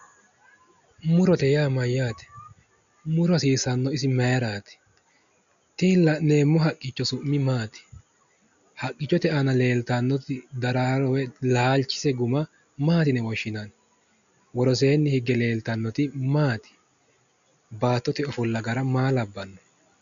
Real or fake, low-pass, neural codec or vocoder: real; 7.2 kHz; none